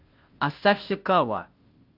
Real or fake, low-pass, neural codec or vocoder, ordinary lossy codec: fake; 5.4 kHz; codec, 16 kHz, 0.5 kbps, FunCodec, trained on Chinese and English, 25 frames a second; Opus, 32 kbps